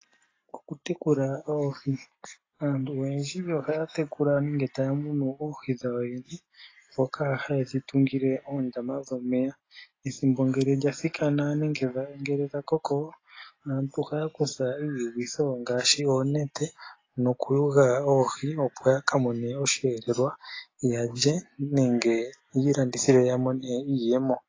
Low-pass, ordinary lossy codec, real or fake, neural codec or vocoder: 7.2 kHz; AAC, 32 kbps; real; none